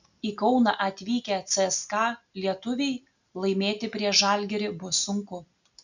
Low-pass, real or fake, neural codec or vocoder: 7.2 kHz; real; none